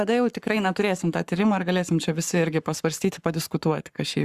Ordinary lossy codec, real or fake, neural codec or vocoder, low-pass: Opus, 64 kbps; fake; codec, 44.1 kHz, 7.8 kbps, Pupu-Codec; 14.4 kHz